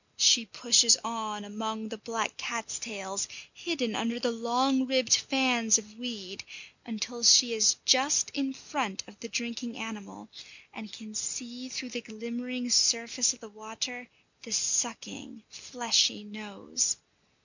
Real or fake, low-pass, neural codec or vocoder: real; 7.2 kHz; none